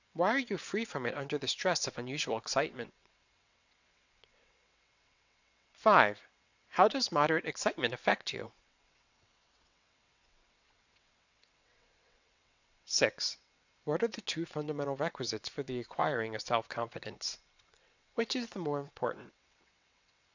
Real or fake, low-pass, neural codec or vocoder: fake; 7.2 kHz; vocoder, 22.05 kHz, 80 mel bands, WaveNeXt